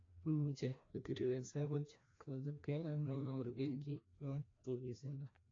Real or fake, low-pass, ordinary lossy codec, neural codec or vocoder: fake; 7.2 kHz; AAC, 32 kbps; codec, 16 kHz, 1 kbps, FreqCodec, larger model